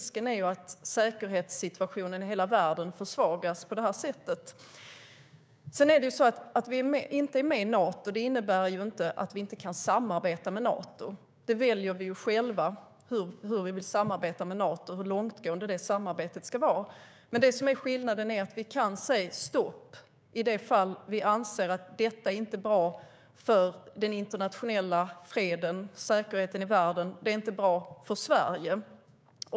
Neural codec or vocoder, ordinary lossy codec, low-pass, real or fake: codec, 16 kHz, 6 kbps, DAC; none; none; fake